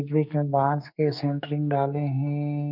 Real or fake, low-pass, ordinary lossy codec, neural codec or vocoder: fake; 5.4 kHz; none; codec, 44.1 kHz, 2.6 kbps, SNAC